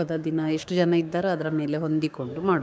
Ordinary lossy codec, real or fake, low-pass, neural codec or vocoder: none; fake; none; codec, 16 kHz, 6 kbps, DAC